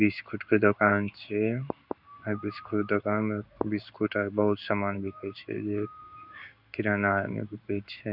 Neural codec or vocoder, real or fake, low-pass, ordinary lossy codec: codec, 16 kHz in and 24 kHz out, 1 kbps, XY-Tokenizer; fake; 5.4 kHz; none